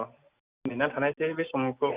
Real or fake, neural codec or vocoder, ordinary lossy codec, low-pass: real; none; Opus, 64 kbps; 3.6 kHz